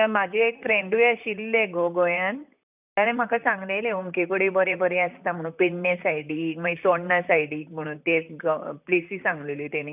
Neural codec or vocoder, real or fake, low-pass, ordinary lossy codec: vocoder, 44.1 kHz, 128 mel bands, Pupu-Vocoder; fake; 3.6 kHz; none